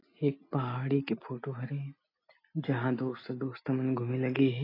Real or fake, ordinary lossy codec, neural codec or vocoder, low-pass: real; AAC, 24 kbps; none; 5.4 kHz